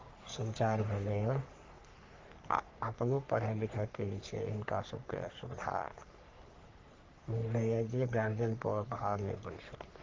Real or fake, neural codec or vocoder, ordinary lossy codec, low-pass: fake; codec, 44.1 kHz, 3.4 kbps, Pupu-Codec; Opus, 32 kbps; 7.2 kHz